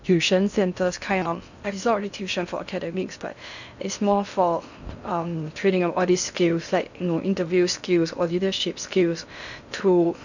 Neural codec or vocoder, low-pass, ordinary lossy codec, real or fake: codec, 16 kHz in and 24 kHz out, 0.6 kbps, FocalCodec, streaming, 2048 codes; 7.2 kHz; none; fake